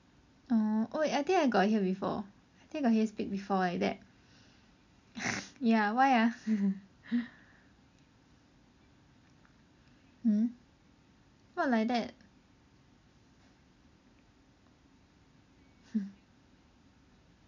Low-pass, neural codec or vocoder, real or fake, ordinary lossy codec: 7.2 kHz; none; real; none